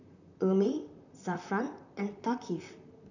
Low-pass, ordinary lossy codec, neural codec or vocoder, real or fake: 7.2 kHz; none; vocoder, 44.1 kHz, 128 mel bands, Pupu-Vocoder; fake